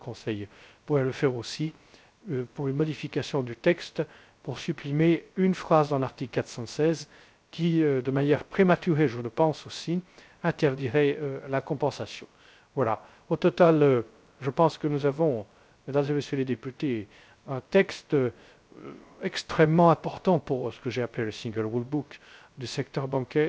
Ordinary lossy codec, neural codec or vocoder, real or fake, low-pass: none; codec, 16 kHz, 0.3 kbps, FocalCodec; fake; none